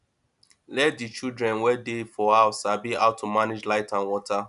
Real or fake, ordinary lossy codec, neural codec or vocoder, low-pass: real; none; none; 10.8 kHz